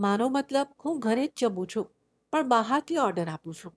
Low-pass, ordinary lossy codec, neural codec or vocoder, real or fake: none; none; autoencoder, 22.05 kHz, a latent of 192 numbers a frame, VITS, trained on one speaker; fake